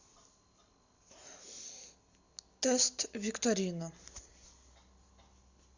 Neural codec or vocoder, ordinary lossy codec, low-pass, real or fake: none; Opus, 64 kbps; 7.2 kHz; real